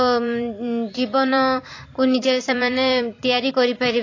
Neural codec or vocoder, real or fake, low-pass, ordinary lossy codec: vocoder, 44.1 kHz, 128 mel bands every 256 samples, BigVGAN v2; fake; 7.2 kHz; AAC, 32 kbps